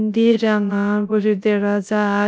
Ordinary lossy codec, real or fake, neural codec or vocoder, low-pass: none; fake; codec, 16 kHz, 0.3 kbps, FocalCodec; none